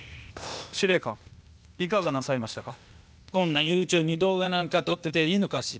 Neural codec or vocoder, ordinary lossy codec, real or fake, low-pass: codec, 16 kHz, 0.8 kbps, ZipCodec; none; fake; none